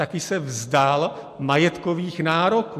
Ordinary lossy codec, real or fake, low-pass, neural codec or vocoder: MP3, 64 kbps; real; 14.4 kHz; none